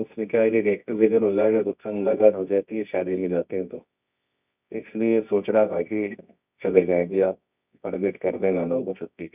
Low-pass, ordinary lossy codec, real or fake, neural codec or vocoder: 3.6 kHz; none; fake; codec, 24 kHz, 0.9 kbps, WavTokenizer, medium music audio release